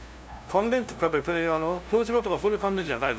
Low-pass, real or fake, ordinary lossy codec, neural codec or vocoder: none; fake; none; codec, 16 kHz, 0.5 kbps, FunCodec, trained on LibriTTS, 25 frames a second